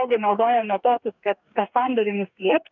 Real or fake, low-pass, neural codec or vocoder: fake; 7.2 kHz; codec, 44.1 kHz, 2.6 kbps, DAC